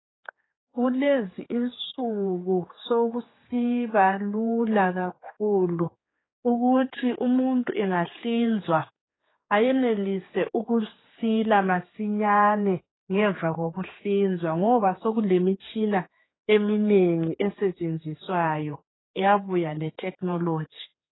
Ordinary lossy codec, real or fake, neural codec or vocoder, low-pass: AAC, 16 kbps; fake; codec, 16 kHz, 4 kbps, X-Codec, HuBERT features, trained on general audio; 7.2 kHz